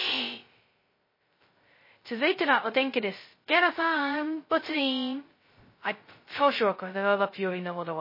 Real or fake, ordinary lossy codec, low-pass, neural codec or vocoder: fake; MP3, 24 kbps; 5.4 kHz; codec, 16 kHz, 0.2 kbps, FocalCodec